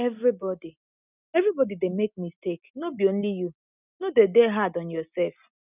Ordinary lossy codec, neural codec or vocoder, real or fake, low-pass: none; none; real; 3.6 kHz